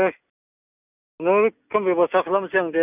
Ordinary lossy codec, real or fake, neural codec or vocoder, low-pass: none; real; none; 3.6 kHz